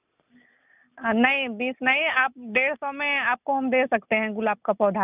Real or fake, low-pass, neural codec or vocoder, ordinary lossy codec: real; 3.6 kHz; none; none